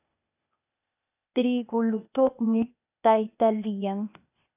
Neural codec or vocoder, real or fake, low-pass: codec, 16 kHz, 0.8 kbps, ZipCodec; fake; 3.6 kHz